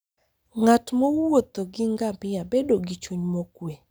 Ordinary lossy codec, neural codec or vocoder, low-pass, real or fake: none; none; none; real